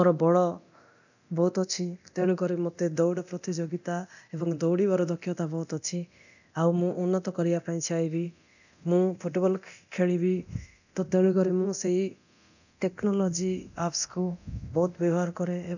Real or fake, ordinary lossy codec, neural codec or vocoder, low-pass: fake; none; codec, 24 kHz, 0.9 kbps, DualCodec; 7.2 kHz